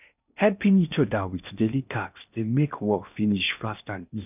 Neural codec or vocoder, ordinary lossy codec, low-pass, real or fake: codec, 16 kHz in and 24 kHz out, 0.6 kbps, FocalCodec, streaming, 4096 codes; none; 3.6 kHz; fake